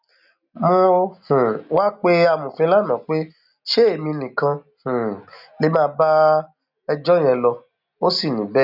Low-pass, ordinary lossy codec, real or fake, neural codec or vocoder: 5.4 kHz; none; real; none